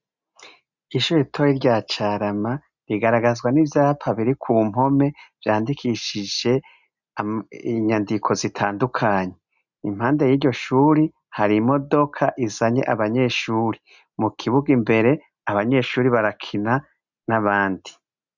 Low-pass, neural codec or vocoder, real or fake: 7.2 kHz; none; real